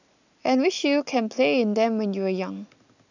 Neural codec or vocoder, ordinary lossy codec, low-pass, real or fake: none; none; 7.2 kHz; real